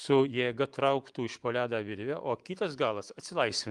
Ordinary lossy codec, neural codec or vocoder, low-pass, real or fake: Opus, 24 kbps; autoencoder, 48 kHz, 128 numbers a frame, DAC-VAE, trained on Japanese speech; 10.8 kHz; fake